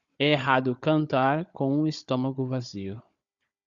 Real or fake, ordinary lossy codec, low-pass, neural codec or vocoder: fake; Opus, 64 kbps; 7.2 kHz; codec, 16 kHz, 16 kbps, FunCodec, trained on Chinese and English, 50 frames a second